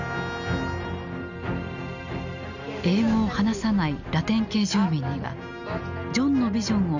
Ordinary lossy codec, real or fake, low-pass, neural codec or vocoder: none; real; 7.2 kHz; none